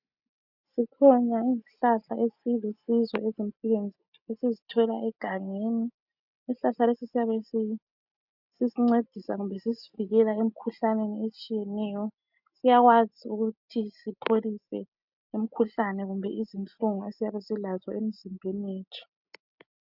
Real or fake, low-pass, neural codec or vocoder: real; 5.4 kHz; none